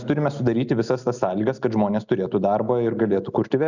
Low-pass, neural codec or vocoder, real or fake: 7.2 kHz; none; real